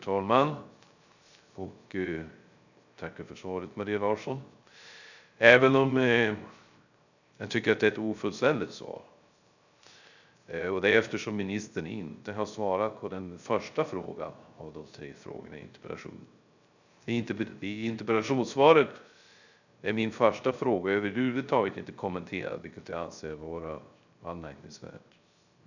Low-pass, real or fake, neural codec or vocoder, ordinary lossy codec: 7.2 kHz; fake; codec, 16 kHz, 0.3 kbps, FocalCodec; none